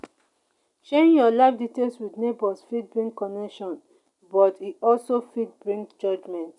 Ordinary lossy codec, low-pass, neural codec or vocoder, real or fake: none; 10.8 kHz; none; real